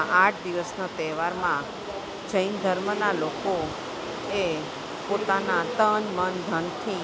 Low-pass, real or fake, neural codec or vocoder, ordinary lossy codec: none; real; none; none